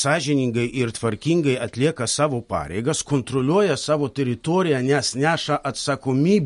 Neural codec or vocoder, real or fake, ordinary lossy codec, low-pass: none; real; MP3, 48 kbps; 14.4 kHz